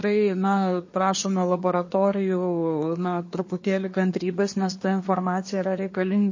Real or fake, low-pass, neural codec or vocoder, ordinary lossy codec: fake; 7.2 kHz; codec, 24 kHz, 1 kbps, SNAC; MP3, 32 kbps